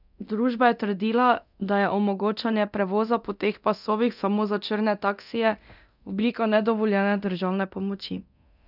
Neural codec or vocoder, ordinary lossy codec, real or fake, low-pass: codec, 24 kHz, 0.9 kbps, DualCodec; none; fake; 5.4 kHz